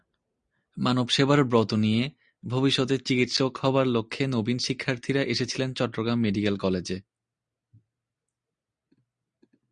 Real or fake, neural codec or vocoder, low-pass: real; none; 9.9 kHz